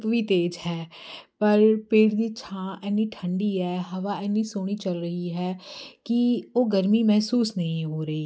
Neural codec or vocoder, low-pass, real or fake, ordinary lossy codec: none; none; real; none